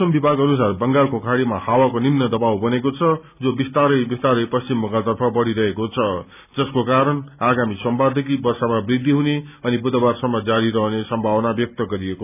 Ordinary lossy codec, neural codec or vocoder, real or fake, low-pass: none; none; real; 3.6 kHz